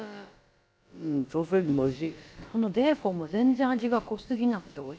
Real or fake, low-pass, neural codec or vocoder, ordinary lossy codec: fake; none; codec, 16 kHz, about 1 kbps, DyCAST, with the encoder's durations; none